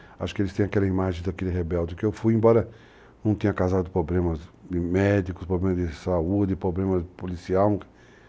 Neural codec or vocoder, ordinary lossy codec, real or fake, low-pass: none; none; real; none